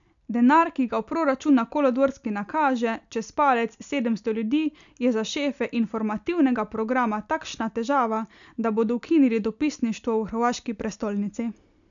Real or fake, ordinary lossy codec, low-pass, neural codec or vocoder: real; none; 7.2 kHz; none